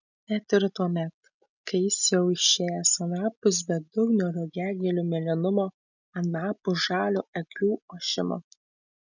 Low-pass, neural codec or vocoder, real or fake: 7.2 kHz; none; real